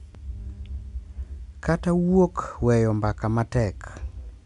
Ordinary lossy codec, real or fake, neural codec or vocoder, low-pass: MP3, 96 kbps; real; none; 10.8 kHz